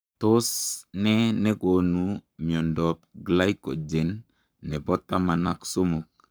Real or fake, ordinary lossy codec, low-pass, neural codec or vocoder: fake; none; none; codec, 44.1 kHz, 7.8 kbps, Pupu-Codec